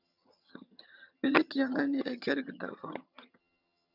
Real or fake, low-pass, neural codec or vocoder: fake; 5.4 kHz; vocoder, 22.05 kHz, 80 mel bands, HiFi-GAN